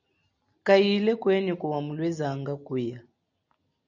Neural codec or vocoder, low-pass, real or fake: none; 7.2 kHz; real